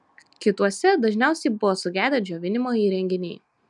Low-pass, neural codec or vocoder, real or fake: 10.8 kHz; none; real